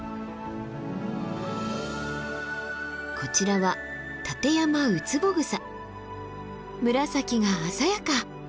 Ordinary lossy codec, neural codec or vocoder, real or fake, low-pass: none; none; real; none